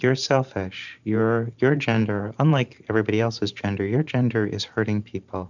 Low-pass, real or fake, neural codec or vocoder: 7.2 kHz; fake; vocoder, 44.1 kHz, 128 mel bands every 512 samples, BigVGAN v2